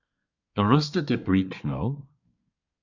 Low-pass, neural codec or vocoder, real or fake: 7.2 kHz; codec, 24 kHz, 1 kbps, SNAC; fake